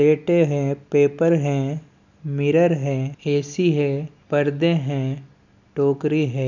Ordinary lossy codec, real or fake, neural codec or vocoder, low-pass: none; real; none; 7.2 kHz